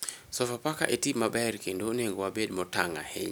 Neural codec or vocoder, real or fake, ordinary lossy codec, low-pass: none; real; none; none